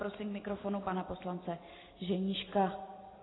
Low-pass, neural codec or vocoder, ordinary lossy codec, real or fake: 7.2 kHz; none; AAC, 16 kbps; real